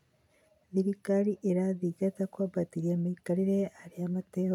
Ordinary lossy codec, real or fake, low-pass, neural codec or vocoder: none; real; 19.8 kHz; none